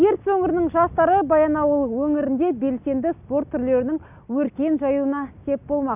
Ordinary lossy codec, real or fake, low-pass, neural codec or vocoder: none; real; 3.6 kHz; none